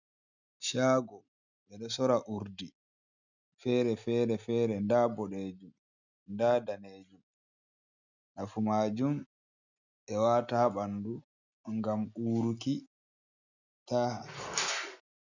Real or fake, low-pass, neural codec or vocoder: real; 7.2 kHz; none